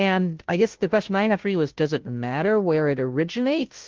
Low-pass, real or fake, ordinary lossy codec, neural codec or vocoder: 7.2 kHz; fake; Opus, 16 kbps; codec, 16 kHz, 0.5 kbps, FunCodec, trained on Chinese and English, 25 frames a second